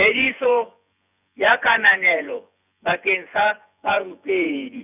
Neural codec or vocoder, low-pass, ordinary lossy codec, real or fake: vocoder, 24 kHz, 100 mel bands, Vocos; 3.6 kHz; none; fake